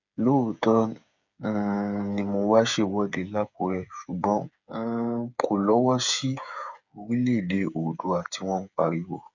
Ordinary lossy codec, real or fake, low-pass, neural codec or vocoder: none; fake; 7.2 kHz; codec, 16 kHz, 8 kbps, FreqCodec, smaller model